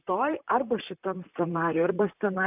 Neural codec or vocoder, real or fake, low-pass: vocoder, 44.1 kHz, 128 mel bands, Pupu-Vocoder; fake; 3.6 kHz